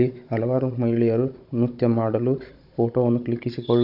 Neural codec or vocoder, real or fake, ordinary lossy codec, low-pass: codec, 16 kHz, 16 kbps, FunCodec, trained on Chinese and English, 50 frames a second; fake; AAC, 48 kbps; 5.4 kHz